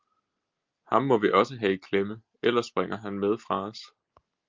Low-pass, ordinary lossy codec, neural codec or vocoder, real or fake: 7.2 kHz; Opus, 32 kbps; none; real